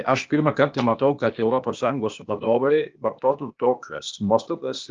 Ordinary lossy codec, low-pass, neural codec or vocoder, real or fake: Opus, 32 kbps; 7.2 kHz; codec, 16 kHz, 0.8 kbps, ZipCodec; fake